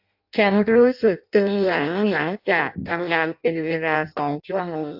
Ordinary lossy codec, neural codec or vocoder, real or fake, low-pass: AAC, 48 kbps; codec, 16 kHz in and 24 kHz out, 0.6 kbps, FireRedTTS-2 codec; fake; 5.4 kHz